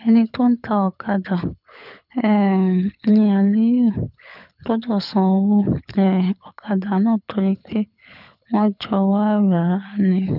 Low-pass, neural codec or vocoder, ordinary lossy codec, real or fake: 5.4 kHz; codec, 24 kHz, 6 kbps, HILCodec; none; fake